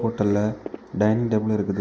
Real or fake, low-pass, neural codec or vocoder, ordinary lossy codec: real; none; none; none